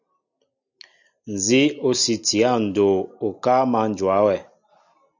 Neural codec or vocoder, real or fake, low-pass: none; real; 7.2 kHz